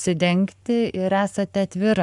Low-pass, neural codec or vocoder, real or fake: 10.8 kHz; codec, 44.1 kHz, 7.8 kbps, Pupu-Codec; fake